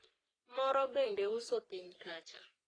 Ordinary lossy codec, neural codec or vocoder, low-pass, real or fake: AAC, 32 kbps; codec, 44.1 kHz, 3.4 kbps, Pupu-Codec; 9.9 kHz; fake